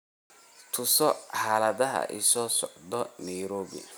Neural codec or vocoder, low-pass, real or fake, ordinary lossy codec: none; none; real; none